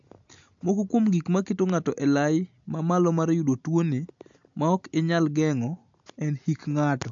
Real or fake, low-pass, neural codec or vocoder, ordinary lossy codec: real; 7.2 kHz; none; AAC, 64 kbps